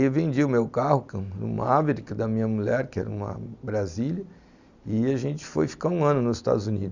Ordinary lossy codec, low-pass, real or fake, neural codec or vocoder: Opus, 64 kbps; 7.2 kHz; real; none